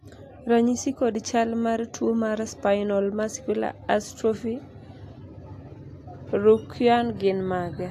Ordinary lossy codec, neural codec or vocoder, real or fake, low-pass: AAC, 64 kbps; none; real; 14.4 kHz